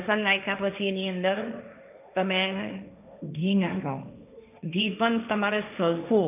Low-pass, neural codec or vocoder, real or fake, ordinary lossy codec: 3.6 kHz; codec, 16 kHz, 1.1 kbps, Voila-Tokenizer; fake; none